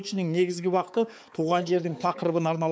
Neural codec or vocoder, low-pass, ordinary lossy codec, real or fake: codec, 16 kHz, 4 kbps, X-Codec, HuBERT features, trained on balanced general audio; none; none; fake